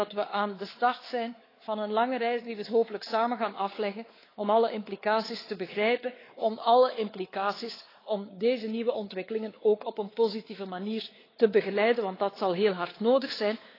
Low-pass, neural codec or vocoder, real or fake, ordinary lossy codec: 5.4 kHz; codec, 16 kHz, 4 kbps, X-Codec, WavLM features, trained on Multilingual LibriSpeech; fake; AAC, 24 kbps